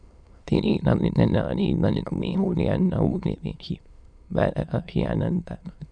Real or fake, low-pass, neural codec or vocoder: fake; 9.9 kHz; autoencoder, 22.05 kHz, a latent of 192 numbers a frame, VITS, trained on many speakers